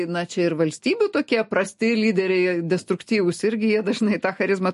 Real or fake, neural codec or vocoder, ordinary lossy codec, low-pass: real; none; MP3, 48 kbps; 14.4 kHz